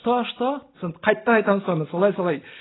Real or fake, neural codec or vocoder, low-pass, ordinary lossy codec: real; none; 7.2 kHz; AAC, 16 kbps